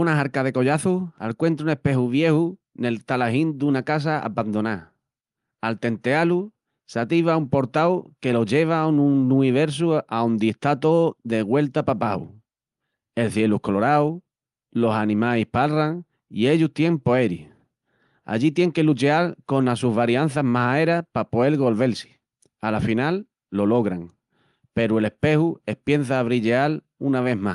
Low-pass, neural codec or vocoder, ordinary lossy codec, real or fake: 10.8 kHz; none; Opus, 32 kbps; real